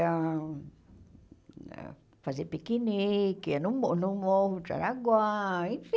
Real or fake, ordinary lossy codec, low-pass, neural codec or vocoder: real; none; none; none